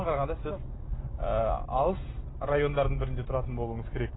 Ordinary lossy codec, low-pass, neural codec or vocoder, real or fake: AAC, 16 kbps; 7.2 kHz; none; real